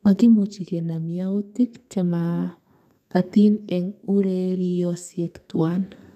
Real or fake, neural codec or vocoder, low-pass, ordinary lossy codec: fake; codec, 32 kHz, 1.9 kbps, SNAC; 14.4 kHz; none